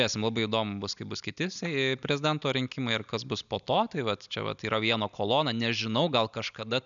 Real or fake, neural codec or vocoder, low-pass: real; none; 7.2 kHz